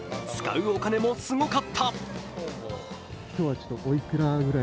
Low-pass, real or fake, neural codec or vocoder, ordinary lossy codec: none; real; none; none